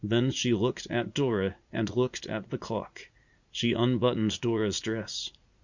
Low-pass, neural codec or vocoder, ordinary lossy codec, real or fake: 7.2 kHz; vocoder, 44.1 kHz, 80 mel bands, Vocos; Opus, 64 kbps; fake